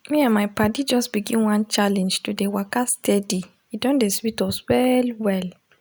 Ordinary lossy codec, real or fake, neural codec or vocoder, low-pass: none; real; none; none